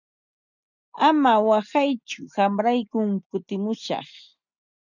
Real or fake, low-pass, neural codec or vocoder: real; 7.2 kHz; none